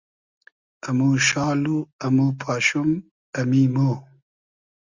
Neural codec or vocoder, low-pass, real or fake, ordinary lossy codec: none; 7.2 kHz; real; Opus, 64 kbps